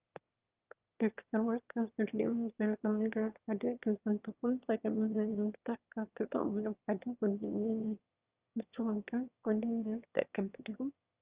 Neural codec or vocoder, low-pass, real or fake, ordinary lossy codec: autoencoder, 22.05 kHz, a latent of 192 numbers a frame, VITS, trained on one speaker; 3.6 kHz; fake; Opus, 32 kbps